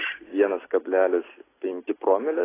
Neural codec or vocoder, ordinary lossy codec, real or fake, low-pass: none; AAC, 16 kbps; real; 3.6 kHz